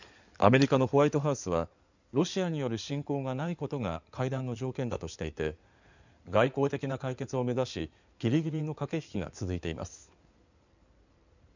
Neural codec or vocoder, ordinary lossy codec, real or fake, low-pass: codec, 16 kHz in and 24 kHz out, 2.2 kbps, FireRedTTS-2 codec; none; fake; 7.2 kHz